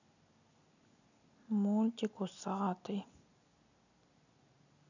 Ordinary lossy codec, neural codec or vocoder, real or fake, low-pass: none; none; real; 7.2 kHz